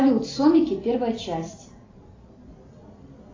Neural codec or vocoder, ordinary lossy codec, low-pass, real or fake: none; AAC, 32 kbps; 7.2 kHz; real